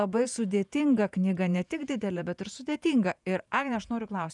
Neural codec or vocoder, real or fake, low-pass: vocoder, 48 kHz, 128 mel bands, Vocos; fake; 10.8 kHz